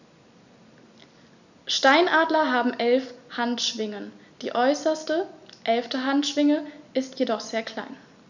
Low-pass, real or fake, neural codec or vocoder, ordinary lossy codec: 7.2 kHz; real; none; none